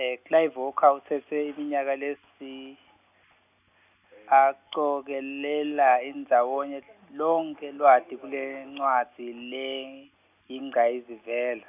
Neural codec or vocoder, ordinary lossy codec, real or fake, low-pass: none; none; real; 3.6 kHz